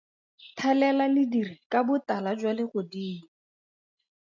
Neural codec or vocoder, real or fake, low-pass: none; real; 7.2 kHz